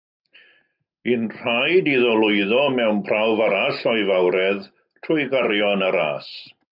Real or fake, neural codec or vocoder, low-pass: real; none; 5.4 kHz